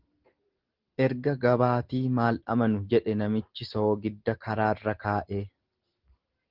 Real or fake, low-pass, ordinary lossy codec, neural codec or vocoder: real; 5.4 kHz; Opus, 16 kbps; none